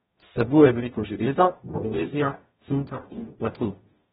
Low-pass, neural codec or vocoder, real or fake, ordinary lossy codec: 19.8 kHz; codec, 44.1 kHz, 0.9 kbps, DAC; fake; AAC, 16 kbps